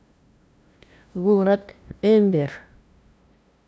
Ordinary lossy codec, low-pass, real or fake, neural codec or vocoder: none; none; fake; codec, 16 kHz, 0.5 kbps, FunCodec, trained on LibriTTS, 25 frames a second